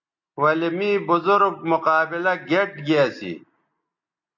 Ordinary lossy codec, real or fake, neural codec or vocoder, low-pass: MP3, 48 kbps; real; none; 7.2 kHz